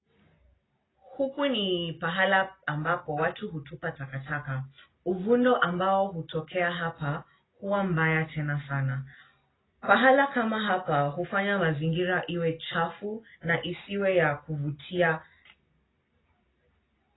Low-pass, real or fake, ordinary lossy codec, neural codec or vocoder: 7.2 kHz; real; AAC, 16 kbps; none